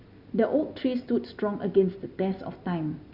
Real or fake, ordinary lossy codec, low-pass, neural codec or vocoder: real; Opus, 64 kbps; 5.4 kHz; none